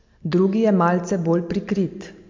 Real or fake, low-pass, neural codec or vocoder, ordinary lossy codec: real; 7.2 kHz; none; MP3, 48 kbps